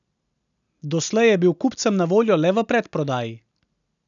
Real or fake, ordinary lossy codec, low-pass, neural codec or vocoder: real; none; 7.2 kHz; none